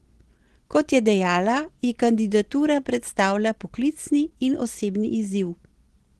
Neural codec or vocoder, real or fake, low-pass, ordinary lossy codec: none; real; 10.8 kHz; Opus, 16 kbps